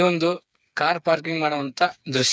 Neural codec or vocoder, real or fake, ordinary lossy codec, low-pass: codec, 16 kHz, 4 kbps, FreqCodec, smaller model; fake; none; none